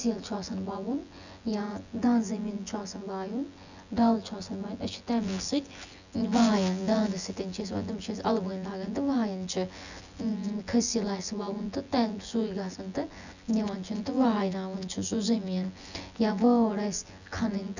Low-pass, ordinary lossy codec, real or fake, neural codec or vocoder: 7.2 kHz; none; fake; vocoder, 24 kHz, 100 mel bands, Vocos